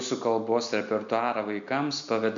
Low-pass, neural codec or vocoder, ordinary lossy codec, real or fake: 7.2 kHz; none; AAC, 64 kbps; real